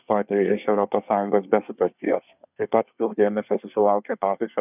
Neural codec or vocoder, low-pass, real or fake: codec, 24 kHz, 1 kbps, SNAC; 3.6 kHz; fake